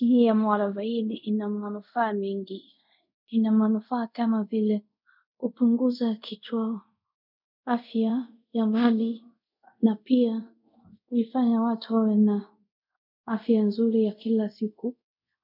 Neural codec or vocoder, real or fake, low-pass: codec, 24 kHz, 0.5 kbps, DualCodec; fake; 5.4 kHz